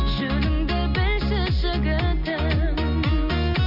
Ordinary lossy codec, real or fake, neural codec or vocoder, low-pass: none; real; none; 5.4 kHz